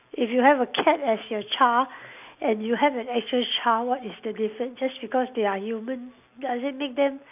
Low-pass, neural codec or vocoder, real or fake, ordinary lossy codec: 3.6 kHz; none; real; none